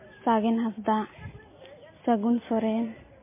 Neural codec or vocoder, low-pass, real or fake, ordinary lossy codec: none; 3.6 kHz; real; MP3, 16 kbps